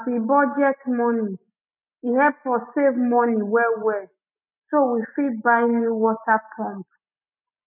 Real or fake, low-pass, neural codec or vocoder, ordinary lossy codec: real; 3.6 kHz; none; none